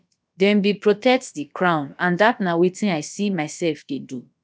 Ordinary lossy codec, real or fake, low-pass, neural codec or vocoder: none; fake; none; codec, 16 kHz, about 1 kbps, DyCAST, with the encoder's durations